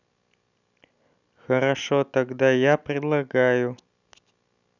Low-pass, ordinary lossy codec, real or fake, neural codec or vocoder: 7.2 kHz; none; real; none